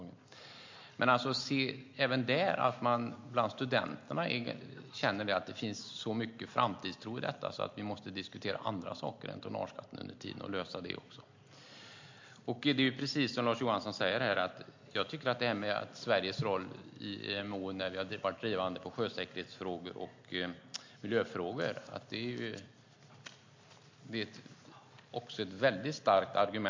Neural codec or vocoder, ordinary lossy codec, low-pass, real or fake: none; MP3, 48 kbps; 7.2 kHz; real